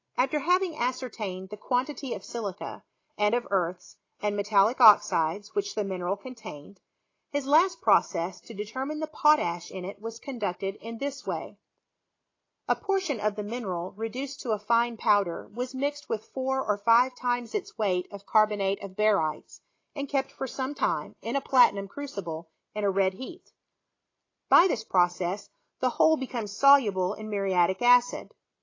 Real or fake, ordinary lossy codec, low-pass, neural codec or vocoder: real; AAC, 32 kbps; 7.2 kHz; none